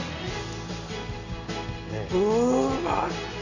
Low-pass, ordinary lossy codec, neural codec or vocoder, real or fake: 7.2 kHz; none; none; real